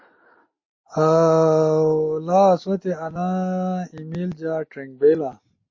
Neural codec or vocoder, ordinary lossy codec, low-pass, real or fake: none; MP3, 32 kbps; 7.2 kHz; real